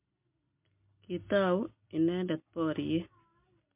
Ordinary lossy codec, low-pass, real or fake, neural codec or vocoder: MP3, 32 kbps; 3.6 kHz; real; none